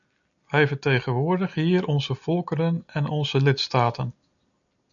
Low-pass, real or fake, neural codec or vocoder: 7.2 kHz; real; none